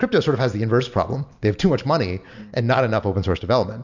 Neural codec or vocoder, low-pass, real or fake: none; 7.2 kHz; real